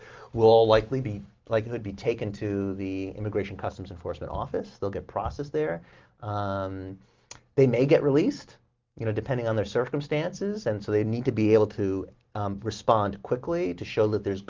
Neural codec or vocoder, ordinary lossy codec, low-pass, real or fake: none; Opus, 32 kbps; 7.2 kHz; real